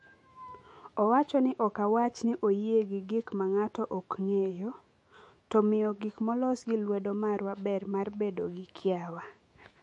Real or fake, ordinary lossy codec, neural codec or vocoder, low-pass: fake; MP3, 48 kbps; autoencoder, 48 kHz, 128 numbers a frame, DAC-VAE, trained on Japanese speech; 10.8 kHz